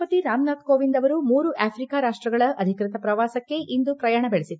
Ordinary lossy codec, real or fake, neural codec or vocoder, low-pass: none; real; none; none